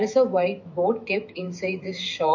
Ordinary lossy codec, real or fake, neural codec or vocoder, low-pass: MP3, 48 kbps; fake; autoencoder, 48 kHz, 128 numbers a frame, DAC-VAE, trained on Japanese speech; 7.2 kHz